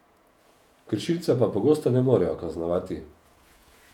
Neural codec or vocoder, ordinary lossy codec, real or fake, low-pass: vocoder, 48 kHz, 128 mel bands, Vocos; none; fake; 19.8 kHz